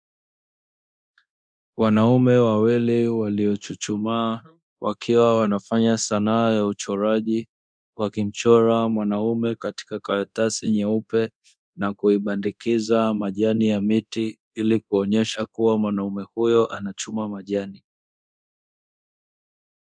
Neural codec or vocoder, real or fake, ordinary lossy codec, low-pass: codec, 24 kHz, 0.9 kbps, DualCodec; fake; MP3, 96 kbps; 9.9 kHz